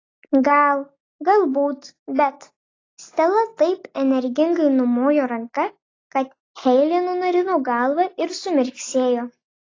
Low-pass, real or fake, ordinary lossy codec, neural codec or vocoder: 7.2 kHz; real; AAC, 32 kbps; none